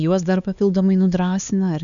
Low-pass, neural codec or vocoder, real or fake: 7.2 kHz; codec, 16 kHz, 4 kbps, X-Codec, HuBERT features, trained on LibriSpeech; fake